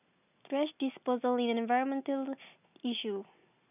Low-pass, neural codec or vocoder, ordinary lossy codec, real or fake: 3.6 kHz; none; none; real